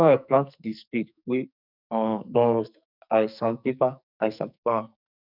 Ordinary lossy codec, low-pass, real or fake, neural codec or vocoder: none; 5.4 kHz; fake; codec, 44.1 kHz, 2.6 kbps, SNAC